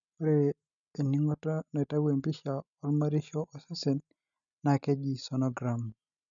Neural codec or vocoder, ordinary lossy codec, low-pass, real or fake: none; none; 7.2 kHz; real